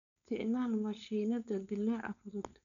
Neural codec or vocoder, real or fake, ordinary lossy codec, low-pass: codec, 16 kHz, 4.8 kbps, FACodec; fake; none; 7.2 kHz